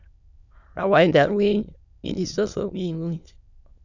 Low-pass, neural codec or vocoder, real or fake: 7.2 kHz; autoencoder, 22.05 kHz, a latent of 192 numbers a frame, VITS, trained on many speakers; fake